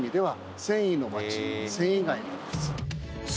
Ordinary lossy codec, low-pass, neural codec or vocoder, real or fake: none; none; none; real